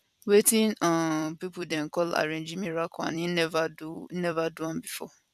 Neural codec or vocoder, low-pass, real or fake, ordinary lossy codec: vocoder, 44.1 kHz, 128 mel bands every 256 samples, BigVGAN v2; 14.4 kHz; fake; none